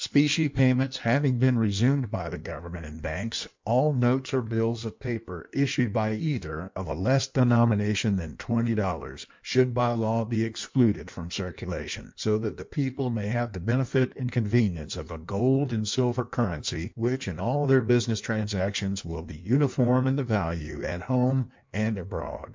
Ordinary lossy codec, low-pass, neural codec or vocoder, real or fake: MP3, 64 kbps; 7.2 kHz; codec, 16 kHz in and 24 kHz out, 1.1 kbps, FireRedTTS-2 codec; fake